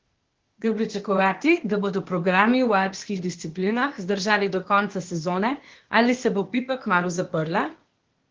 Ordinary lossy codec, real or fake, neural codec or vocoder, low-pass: Opus, 16 kbps; fake; codec, 16 kHz, 0.8 kbps, ZipCodec; 7.2 kHz